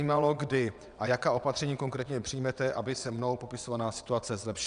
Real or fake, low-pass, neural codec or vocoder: fake; 9.9 kHz; vocoder, 22.05 kHz, 80 mel bands, WaveNeXt